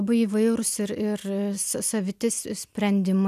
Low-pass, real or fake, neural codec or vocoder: 14.4 kHz; real; none